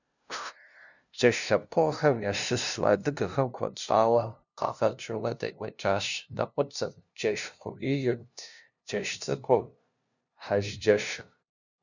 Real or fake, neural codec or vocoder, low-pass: fake; codec, 16 kHz, 0.5 kbps, FunCodec, trained on LibriTTS, 25 frames a second; 7.2 kHz